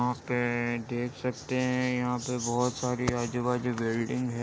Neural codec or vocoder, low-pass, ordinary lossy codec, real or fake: none; none; none; real